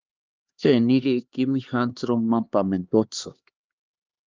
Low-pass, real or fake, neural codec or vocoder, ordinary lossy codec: 7.2 kHz; fake; codec, 16 kHz, 2 kbps, X-Codec, HuBERT features, trained on LibriSpeech; Opus, 24 kbps